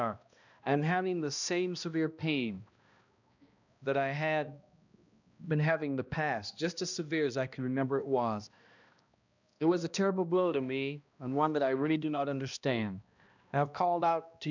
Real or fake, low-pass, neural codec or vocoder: fake; 7.2 kHz; codec, 16 kHz, 1 kbps, X-Codec, HuBERT features, trained on balanced general audio